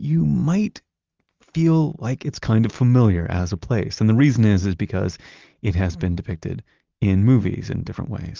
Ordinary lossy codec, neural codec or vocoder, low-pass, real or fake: Opus, 32 kbps; none; 7.2 kHz; real